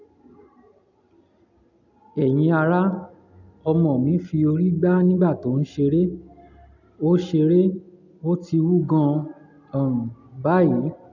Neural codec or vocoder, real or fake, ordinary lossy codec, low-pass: none; real; none; 7.2 kHz